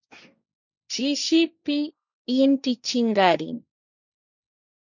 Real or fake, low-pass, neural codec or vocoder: fake; 7.2 kHz; codec, 16 kHz, 1.1 kbps, Voila-Tokenizer